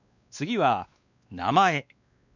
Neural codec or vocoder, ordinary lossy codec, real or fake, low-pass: codec, 16 kHz, 2 kbps, X-Codec, WavLM features, trained on Multilingual LibriSpeech; none; fake; 7.2 kHz